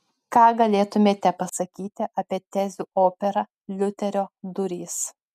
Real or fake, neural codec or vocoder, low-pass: real; none; 14.4 kHz